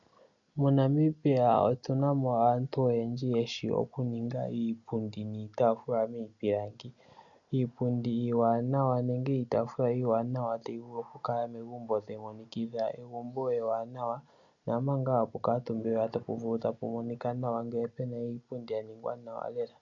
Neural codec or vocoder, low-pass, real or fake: none; 7.2 kHz; real